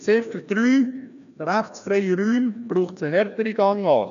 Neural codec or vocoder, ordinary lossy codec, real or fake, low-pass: codec, 16 kHz, 1 kbps, FreqCodec, larger model; none; fake; 7.2 kHz